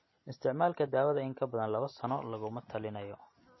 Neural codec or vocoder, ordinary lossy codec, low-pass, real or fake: none; MP3, 24 kbps; 7.2 kHz; real